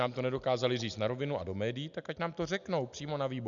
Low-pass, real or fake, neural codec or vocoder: 7.2 kHz; real; none